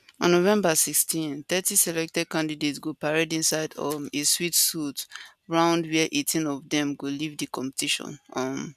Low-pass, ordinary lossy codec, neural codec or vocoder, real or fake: 14.4 kHz; none; none; real